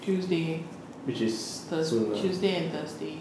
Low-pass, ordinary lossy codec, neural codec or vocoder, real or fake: none; none; none; real